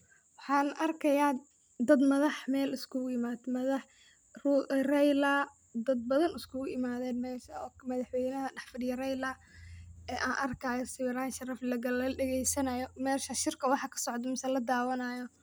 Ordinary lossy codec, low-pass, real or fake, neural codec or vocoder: none; none; real; none